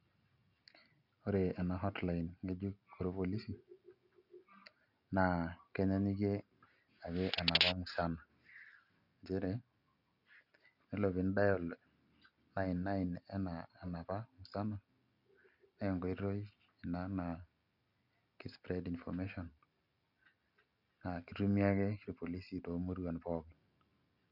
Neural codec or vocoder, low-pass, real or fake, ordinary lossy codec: none; 5.4 kHz; real; none